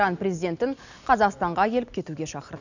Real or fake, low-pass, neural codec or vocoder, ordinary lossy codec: real; 7.2 kHz; none; none